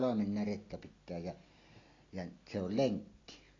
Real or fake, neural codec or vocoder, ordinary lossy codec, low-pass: real; none; AAC, 32 kbps; 7.2 kHz